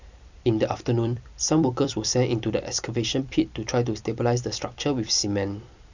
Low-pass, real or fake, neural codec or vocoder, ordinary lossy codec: 7.2 kHz; fake; vocoder, 44.1 kHz, 128 mel bands every 256 samples, BigVGAN v2; none